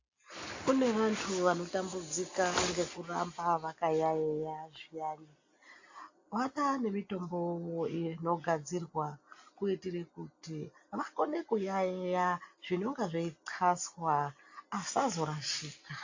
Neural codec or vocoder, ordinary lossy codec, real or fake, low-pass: none; AAC, 48 kbps; real; 7.2 kHz